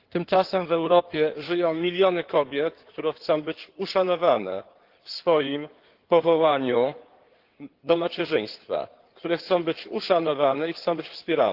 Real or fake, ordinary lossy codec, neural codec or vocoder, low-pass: fake; Opus, 16 kbps; codec, 16 kHz in and 24 kHz out, 2.2 kbps, FireRedTTS-2 codec; 5.4 kHz